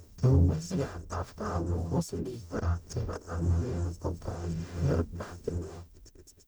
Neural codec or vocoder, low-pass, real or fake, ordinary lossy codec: codec, 44.1 kHz, 0.9 kbps, DAC; none; fake; none